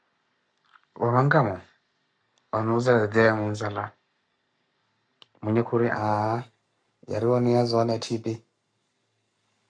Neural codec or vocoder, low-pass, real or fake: codec, 44.1 kHz, 7.8 kbps, Pupu-Codec; 9.9 kHz; fake